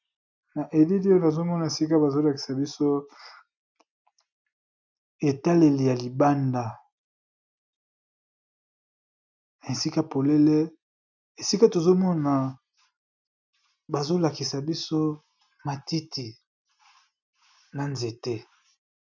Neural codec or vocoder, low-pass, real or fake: none; 7.2 kHz; real